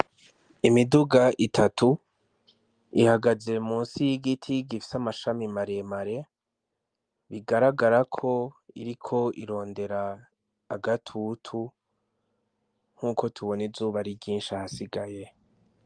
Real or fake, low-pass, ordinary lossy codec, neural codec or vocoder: real; 9.9 kHz; Opus, 32 kbps; none